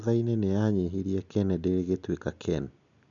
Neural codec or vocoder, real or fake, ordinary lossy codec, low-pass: none; real; none; 7.2 kHz